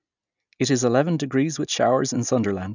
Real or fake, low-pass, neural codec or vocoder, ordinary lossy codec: real; 7.2 kHz; none; none